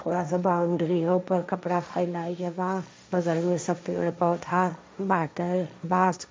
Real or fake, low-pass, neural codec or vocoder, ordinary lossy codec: fake; none; codec, 16 kHz, 1.1 kbps, Voila-Tokenizer; none